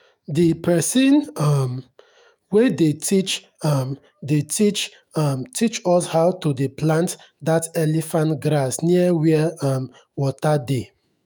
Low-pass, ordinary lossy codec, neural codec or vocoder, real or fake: none; none; autoencoder, 48 kHz, 128 numbers a frame, DAC-VAE, trained on Japanese speech; fake